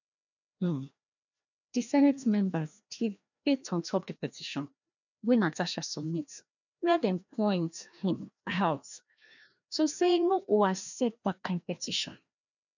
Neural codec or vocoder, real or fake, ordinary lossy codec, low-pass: codec, 16 kHz, 1 kbps, FreqCodec, larger model; fake; none; 7.2 kHz